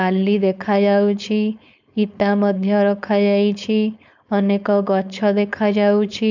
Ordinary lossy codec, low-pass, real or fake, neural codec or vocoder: none; 7.2 kHz; fake; codec, 16 kHz, 4.8 kbps, FACodec